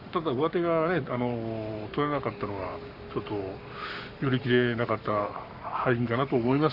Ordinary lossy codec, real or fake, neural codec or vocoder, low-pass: Opus, 64 kbps; fake; codec, 44.1 kHz, 7.8 kbps, Pupu-Codec; 5.4 kHz